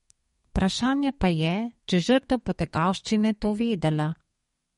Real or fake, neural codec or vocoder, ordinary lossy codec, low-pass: fake; codec, 32 kHz, 1.9 kbps, SNAC; MP3, 48 kbps; 14.4 kHz